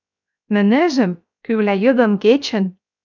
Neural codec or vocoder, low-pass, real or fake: codec, 16 kHz, 0.7 kbps, FocalCodec; 7.2 kHz; fake